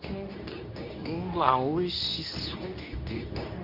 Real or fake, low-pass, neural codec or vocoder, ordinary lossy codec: fake; 5.4 kHz; codec, 24 kHz, 0.9 kbps, WavTokenizer, medium speech release version 2; none